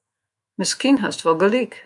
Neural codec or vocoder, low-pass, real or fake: autoencoder, 48 kHz, 128 numbers a frame, DAC-VAE, trained on Japanese speech; 10.8 kHz; fake